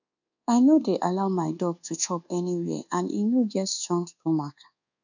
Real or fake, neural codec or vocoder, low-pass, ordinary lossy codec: fake; codec, 24 kHz, 1.2 kbps, DualCodec; 7.2 kHz; none